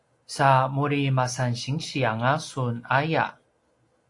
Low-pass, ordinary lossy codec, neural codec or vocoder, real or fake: 10.8 kHz; AAC, 48 kbps; none; real